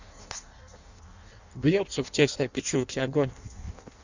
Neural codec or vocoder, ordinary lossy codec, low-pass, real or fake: codec, 16 kHz in and 24 kHz out, 0.6 kbps, FireRedTTS-2 codec; Opus, 64 kbps; 7.2 kHz; fake